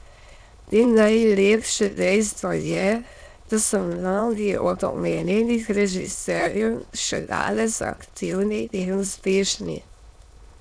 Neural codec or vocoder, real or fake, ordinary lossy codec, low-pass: autoencoder, 22.05 kHz, a latent of 192 numbers a frame, VITS, trained on many speakers; fake; none; none